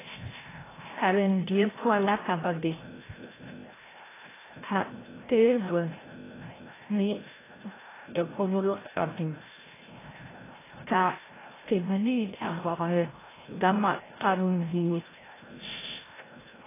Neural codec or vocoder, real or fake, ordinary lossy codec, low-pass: codec, 16 kHz, 0.5 kbps, FreqCodec, larger model; fake; AAC, 16 kbps; 3.6 kHz